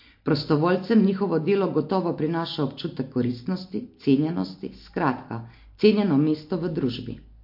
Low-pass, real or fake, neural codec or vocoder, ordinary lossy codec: 5.4 kHz; real; none; MP3, 32 kbps